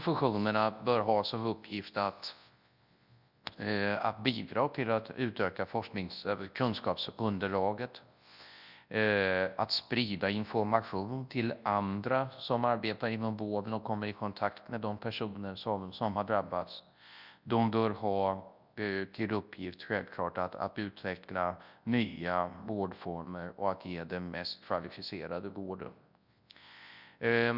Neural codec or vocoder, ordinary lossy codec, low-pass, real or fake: codec, 24 kHz, 0.9 kbps, WavTokenizer, large speech release; Opus, 64 kbps; 5.4 kHz; fake